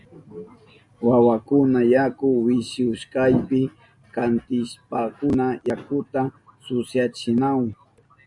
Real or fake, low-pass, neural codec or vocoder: real; 10.8 kHz; none